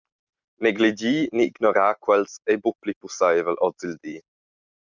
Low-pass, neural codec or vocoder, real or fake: 7.2 kHz; none; real